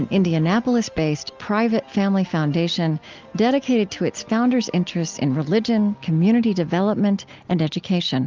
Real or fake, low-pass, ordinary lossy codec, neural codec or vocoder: real; 7.2 kHz; Opus, 32 kbps; none